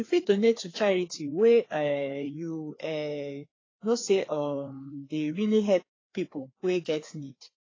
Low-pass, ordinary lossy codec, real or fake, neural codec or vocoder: 7.2 kHz; AAC, 32 kbps; fake; codec, 16 kHz, 2 kbps, FreqCodec, larger model